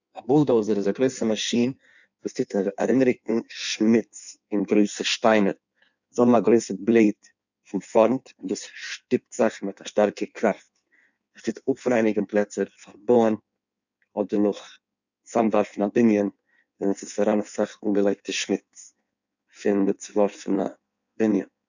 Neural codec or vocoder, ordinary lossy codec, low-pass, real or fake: codec, 16 kHz in and 24 kHz out, 1.1 kbps, FireRedTTS-2 codec; none; 7.2 kHz; fake